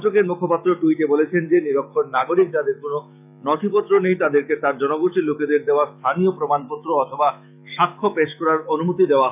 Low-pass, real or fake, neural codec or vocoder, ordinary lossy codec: 3.6 kHz; fake; autoencoder, 48 kHz, 128 numbers a frame, DAC-VAE, trained on Japanese speech; none